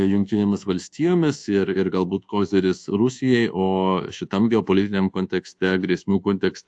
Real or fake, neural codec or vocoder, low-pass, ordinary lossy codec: fake; codec, 24 kHz, 1.2 kbps, DualCodec; 9.9 kHz; AAC, 64 kbps